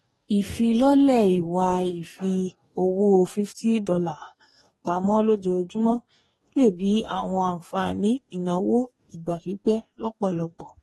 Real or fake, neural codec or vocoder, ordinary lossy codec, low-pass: fake; codec, 44.1 kHz, 2.6 kbps, DAC; AAC, 32 kbps; 19.8 kHz